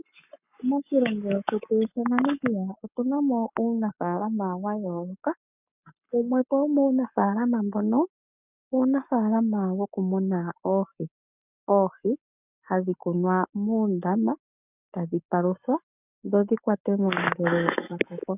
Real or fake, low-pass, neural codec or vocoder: fake; 3.6 kHz; codec, 44.1 kHz, 7.8 kbps, Pupu-Codec